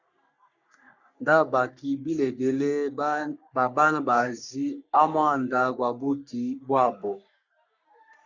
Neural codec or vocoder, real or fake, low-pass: codec, 44.1 kHz, 3.4 kbps, Pupu-Codec; fake; 7.2 kHz